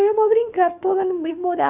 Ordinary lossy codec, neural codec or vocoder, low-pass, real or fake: none; autoencoder, 48 kHz, 32 numbers a frame, DAC-VAE, trained on Japanese speech; 3.6 kHz; fake